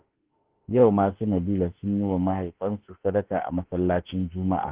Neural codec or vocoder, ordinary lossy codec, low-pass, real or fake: autoencoder, 48 kHz, 32 numbers a frame, DAC-VAE, trained on Japanese speech; Opus, 16 kbps; 3.6 kHz; fake